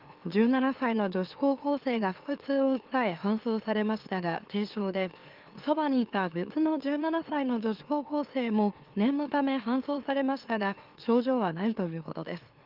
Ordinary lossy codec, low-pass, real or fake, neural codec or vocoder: Opus, 32 kbps; 5.4 kHz; fake; autoencoder, 44.1 kHz, a latent of 192 numbers a frame, MeloTTS